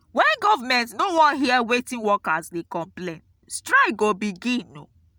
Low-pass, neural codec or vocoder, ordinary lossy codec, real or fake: none; none; none; real